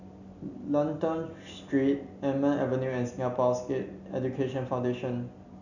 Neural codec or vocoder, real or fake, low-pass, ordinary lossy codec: none; real; 7.2 kHz; none